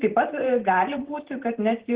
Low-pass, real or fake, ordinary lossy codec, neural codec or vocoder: 3.6 kHz; real; Opus, 16 kbps; none